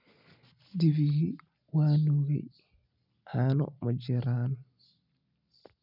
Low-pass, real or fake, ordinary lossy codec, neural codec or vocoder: 5.4 kHz; real; none; none